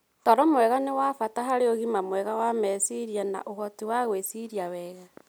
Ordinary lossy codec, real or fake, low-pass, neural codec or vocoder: none; real; none; none